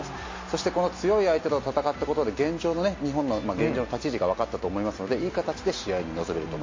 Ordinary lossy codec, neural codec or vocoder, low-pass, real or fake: MP3, 32 kbps; none; 7.2 kHz; real